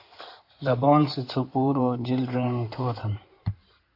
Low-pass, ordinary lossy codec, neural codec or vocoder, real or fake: 5.4 kHz; AAC, 32 kbps; codec, 16 kHz in and 24 kHz out, 2.2 kbps, FireRedTTS-2 codec; fake